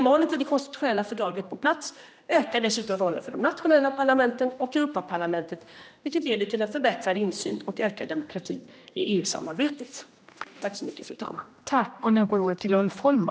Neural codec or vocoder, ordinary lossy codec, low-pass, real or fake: codec, 16 kHz, 1 kbps, X-Codec, HuBERT features, trained on general audio; none; none; fake